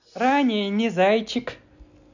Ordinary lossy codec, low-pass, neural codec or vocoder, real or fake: none; 7.2 kHz; none; real